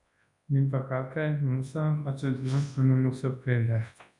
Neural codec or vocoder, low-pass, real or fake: codec, 24 kHz, 0.9 kbps, WavTokenizer, large speech release; 10.8 kHz; fake